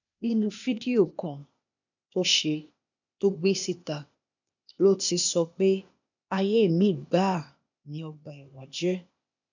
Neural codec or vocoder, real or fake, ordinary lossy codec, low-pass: codec, 16 kHz, 0.8 kbps, ZipCodec; fake; none; 7.2 kHz